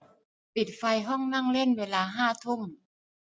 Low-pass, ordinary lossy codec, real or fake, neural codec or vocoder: none; none; real; none